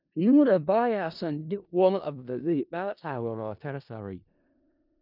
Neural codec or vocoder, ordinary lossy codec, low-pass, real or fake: codec, 16 kHz in and 24 kHz out, 0.4 kbps, LongCat-Audio-Codec, four codebook decoder; none; 5.4 kHz; fake